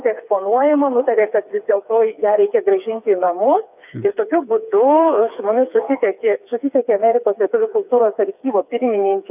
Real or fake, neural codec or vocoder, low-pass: fake; codec, 16 kHz, 4 kbps, FreqCodec, smaller model; 3.6 kHz